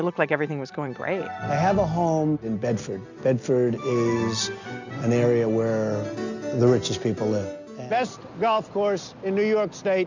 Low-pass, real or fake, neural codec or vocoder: 7.2 kHz; real; none